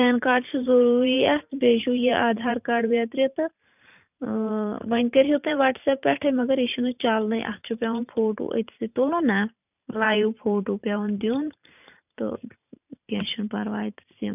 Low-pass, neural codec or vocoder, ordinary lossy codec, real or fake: 3.6 kHz; vocoder, 44.1 kHz, 128 mel bands every 512 samples, BigVGAN v2; none; fake